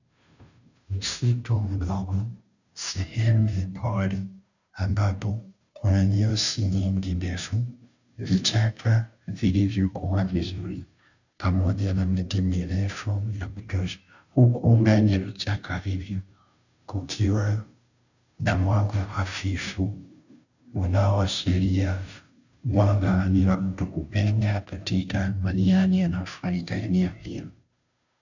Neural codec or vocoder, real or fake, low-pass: codec, 16 kHz, 0.5 kbps, FunCodec, trained on Chinese and English, 25 frames a second; fake; 7.2 kHz